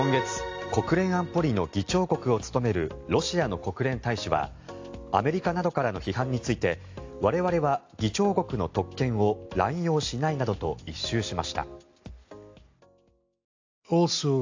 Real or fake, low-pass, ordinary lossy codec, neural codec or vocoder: real; 7.2 kHz; AAC, 48 kbps; none